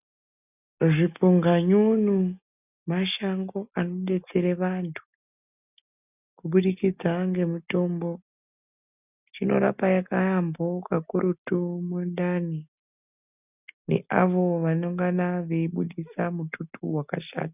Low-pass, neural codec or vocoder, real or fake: 3.6 kHz; none; real